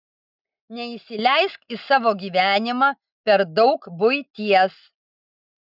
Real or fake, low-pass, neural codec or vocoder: real; 5.4 kHz; none